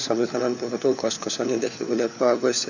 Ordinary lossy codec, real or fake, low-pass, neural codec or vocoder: none; fake; 7.2 kHz; codec, 16 kHz, 4 kbps, FunCodec, trained on LibriTTS, 50 frames a second